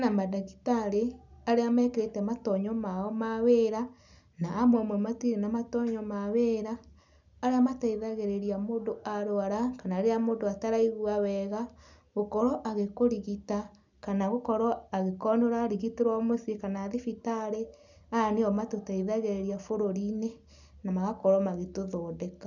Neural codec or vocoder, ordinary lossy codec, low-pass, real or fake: none; none; 7.2 kHz; real